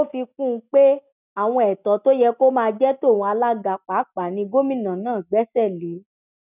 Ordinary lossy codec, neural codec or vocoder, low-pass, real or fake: none; none; 3.6 kHz; real